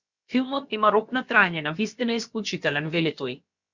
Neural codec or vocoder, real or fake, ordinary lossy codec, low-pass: codec, 16 kHz, about 1 kbps, DyCAST, with the encoder's durations; fake; Opus, 64 kbps; 7.2 kHz